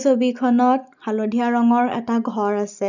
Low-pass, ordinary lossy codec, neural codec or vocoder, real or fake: 7.2 kHz; none; none; real